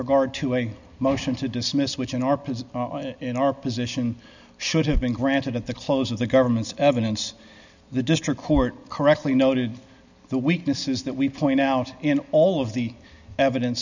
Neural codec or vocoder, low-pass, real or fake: none; 7.2 kHz; real